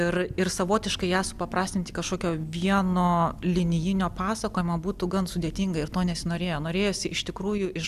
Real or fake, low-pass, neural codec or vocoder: real; 14.4 kHz; none